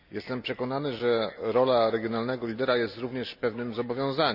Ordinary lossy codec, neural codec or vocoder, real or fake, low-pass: none; none; real; 5.4 kHz